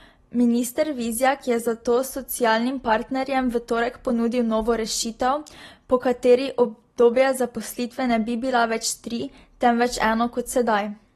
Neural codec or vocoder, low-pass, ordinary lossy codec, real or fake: none; 19.8 kHz; AAC, 32 kbps; real